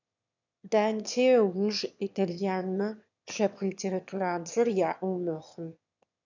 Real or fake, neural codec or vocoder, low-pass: fake; autoencoder, 22.05 kHz, a latent of 192 numbers a frame, VITS, trained on one speaker; 7.2 kHz